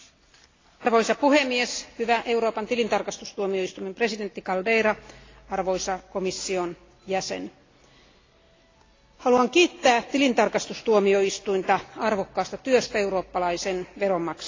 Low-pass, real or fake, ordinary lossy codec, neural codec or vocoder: 7.2 kHz; real; AAC, 32 kbps; none